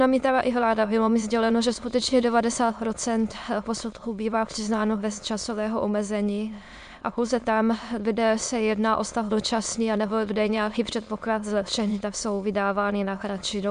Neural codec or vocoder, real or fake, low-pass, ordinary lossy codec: autoencoder, 22.05 kHz, a latent of 192 numbers a frame, VITS, trained on many speakers; fake; 9.9 kHz; MP3, 64 kbps